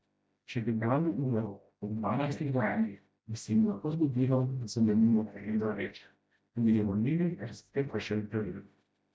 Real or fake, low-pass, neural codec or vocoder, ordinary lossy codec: fake; none; codec, 16 kHz, 0.5 kbps, FreqCodec, smaller model; none